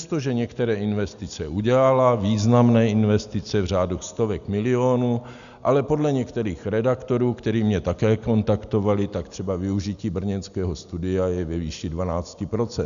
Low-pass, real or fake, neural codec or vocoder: 7.2 kHz; real; none